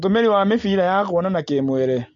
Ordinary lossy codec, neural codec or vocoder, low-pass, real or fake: AAC, 64 kbps; none; 7.2 kHz; real